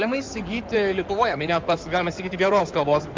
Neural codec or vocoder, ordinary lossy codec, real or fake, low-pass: codec, 16 kHz in and 24 kHz out, 2.2 kbps, FireRedTTS-2 codec; Opus, 24 kbps; fake; 7.2 kHz